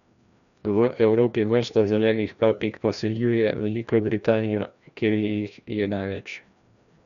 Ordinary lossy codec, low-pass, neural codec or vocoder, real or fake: none; 7.2 kHz; codec, 16 kHz, 1 kbps, FreqCodec, larger model; fake